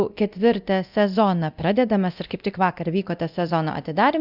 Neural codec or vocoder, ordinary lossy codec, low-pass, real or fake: codec, 24 kHz, 0.9 kbps, DualCodec; Opus, 64 kbps; 5.4 kHz; fake